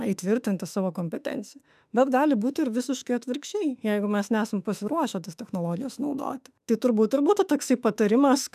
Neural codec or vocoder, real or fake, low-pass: autoencoder, 48 kHz, 32 numbers a frame, DAC-VAE, trained on Japanese speech; fake; 14.4 kHz